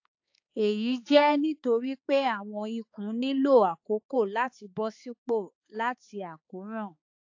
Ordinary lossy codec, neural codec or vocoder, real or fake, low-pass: AAC, 48 kbps; codec, 16 kHz, 4 kbps, X-Codec, HuBERT features, trained on balanced general audio; fake; 7.2 kHz